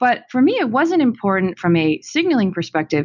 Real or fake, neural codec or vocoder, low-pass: real; none; 7.2 kHz